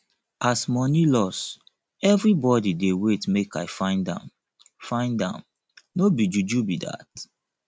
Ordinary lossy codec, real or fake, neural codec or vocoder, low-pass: none; real; none; none